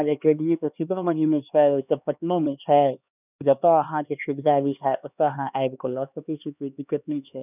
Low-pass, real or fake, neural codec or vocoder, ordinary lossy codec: 3.6 kHz; fake; codec, 16 kHz, 2 kbps, X-Codec, HuBERT features, trained on LibriSpeech; none